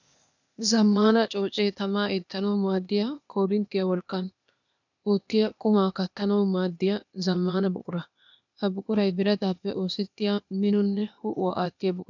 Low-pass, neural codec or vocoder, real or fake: 7.2 kHz; codec, 16 kHz, 0.8 kbps, ZipCodec; fake